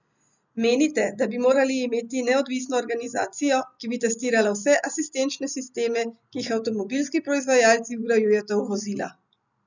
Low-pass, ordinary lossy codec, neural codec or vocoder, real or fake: 7.2 kHz; none; none; real